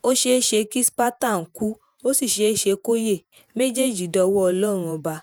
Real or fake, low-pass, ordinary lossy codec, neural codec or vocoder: fake; none; none; vocoder, 48 kHz, 128 mel bands, Vocos